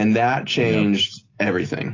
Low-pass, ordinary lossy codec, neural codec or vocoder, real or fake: 7.2 kHz; AAC, 32 kbps; none; real